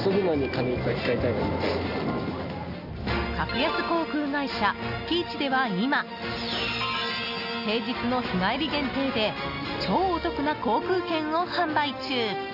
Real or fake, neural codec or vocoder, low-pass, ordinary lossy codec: real; none; 5.4 kHz; none